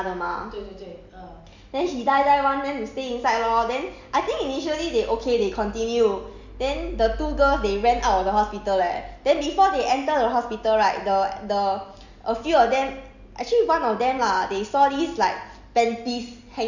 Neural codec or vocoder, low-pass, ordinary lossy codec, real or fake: none; 7.2 kHz; none; real